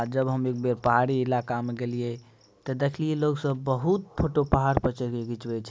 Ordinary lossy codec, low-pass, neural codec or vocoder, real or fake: none; none; none; real